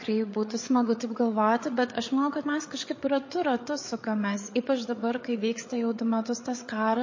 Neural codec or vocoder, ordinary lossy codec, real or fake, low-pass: codec, 16 kHz, 8 kbps, FreqCodec, larger model; MP3, 32 kbps; fake; 7.2 kHz